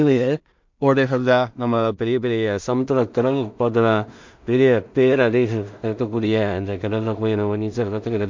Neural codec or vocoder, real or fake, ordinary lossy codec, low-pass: codec, 16 kHz in and 24 kHz out, 0.4 kbps, LongCat-Audio-Codec, two codebook decoder; fake; MP3, 64 kbps; 7.2 kHz